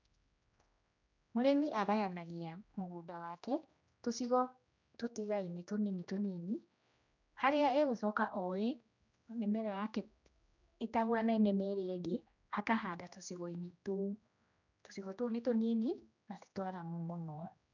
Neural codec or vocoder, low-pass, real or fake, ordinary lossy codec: codec, 16 kHz, 1 kbps, X-Codec, HuBERT features, trained on general audio; 7.2 kHz; fake; none